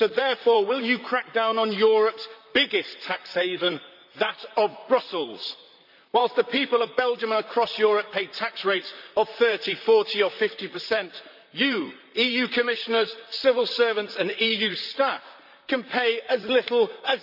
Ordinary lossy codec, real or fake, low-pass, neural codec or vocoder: none; fake; 5.4 kHz; vocoder, 44.1 kHz, 128 mel bands, Pupu-Vocoder